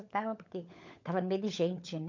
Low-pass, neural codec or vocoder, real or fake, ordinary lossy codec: 7.2 kHz; codec, 16 kHz, 16 kbps, FunCodec, trained on LibriTTS, 50 frames a second; fake; MP3, 48 kbps